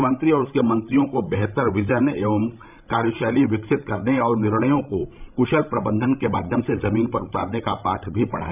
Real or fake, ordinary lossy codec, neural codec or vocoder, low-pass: fake; none; codec, 16 kHz, 16 kbps, FreqCodec, larger model; 3.6 kHz